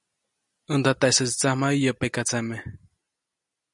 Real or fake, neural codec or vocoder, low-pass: real; none; 10.8 kHz